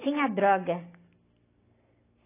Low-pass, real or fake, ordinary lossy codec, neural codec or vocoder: 3.6 kHz; real; AAC, 24 kbps; none